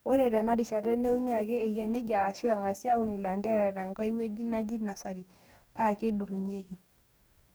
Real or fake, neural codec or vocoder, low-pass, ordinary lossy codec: fake; codec, 44.1 kHz, 2.6 kbps, DAC; none; none